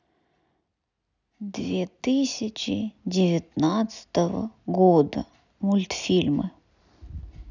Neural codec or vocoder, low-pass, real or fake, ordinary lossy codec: none; 7.2 kHz; real; none